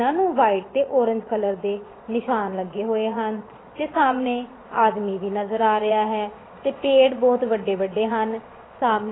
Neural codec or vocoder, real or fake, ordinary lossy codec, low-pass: vocoder, 22.05 kHz, 80 mel bands, Vocos; fake; AAC, 16 kbps; 7.2 kHz